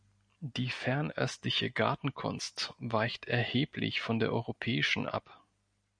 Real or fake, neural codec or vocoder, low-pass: real; none; 9.9 kHz